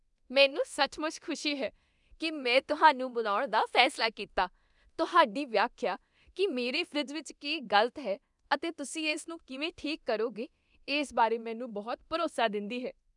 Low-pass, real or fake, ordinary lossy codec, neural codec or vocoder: 10.8 kHz; fake; none; codec, 24 kHz, 0.9 kbps, DualCodec